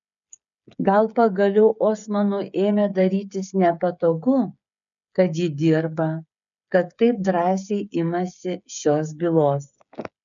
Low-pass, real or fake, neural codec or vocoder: 7.2 kHz; fake; codec, 16 kHz, 8 kbps, FreqCodec, smaller model